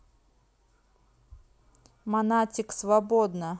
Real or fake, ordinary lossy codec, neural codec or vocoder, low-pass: real; none; none; none